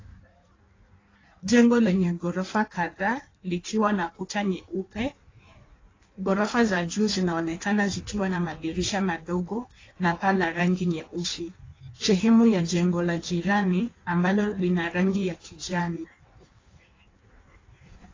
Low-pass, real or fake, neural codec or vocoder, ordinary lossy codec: 7.2 kHz; fake; codec, 16 kHz in and 24 kHz out, 1.1 kbps, FireRedTTS-2 codec; AAC, 32 kbps